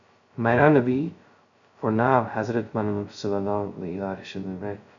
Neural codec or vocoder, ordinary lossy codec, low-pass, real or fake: codec, 16 kHz, 0.2 kbps, FocalCodec; MP3, 96 kbps; 7.2 kHz; fake